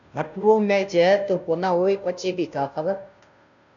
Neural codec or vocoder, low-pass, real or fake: codec, 16 kHz, 0.5 kbps, FunCodec, trained on Chinese and English, 25 frames a second; 7.2 kHz; fake